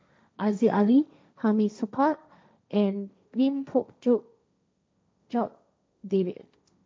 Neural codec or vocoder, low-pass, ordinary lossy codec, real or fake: codec, 16 kHz, 1.1 kbps, Voila-Tokenizer; none; none; fake